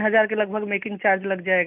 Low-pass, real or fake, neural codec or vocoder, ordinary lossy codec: 3.6 kHz; real; none; none